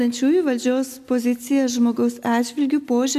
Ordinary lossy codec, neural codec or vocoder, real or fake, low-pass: MP3, 96 kbps; none; real; 14.4 kHz